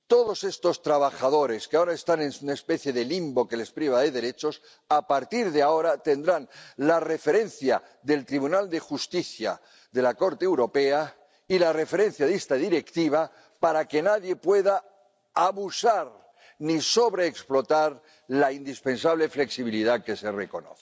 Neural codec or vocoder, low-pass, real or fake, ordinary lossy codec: none; none; real; none